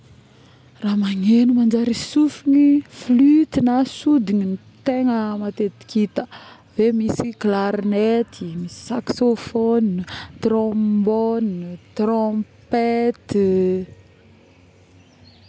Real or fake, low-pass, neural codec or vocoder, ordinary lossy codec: real; none; none; none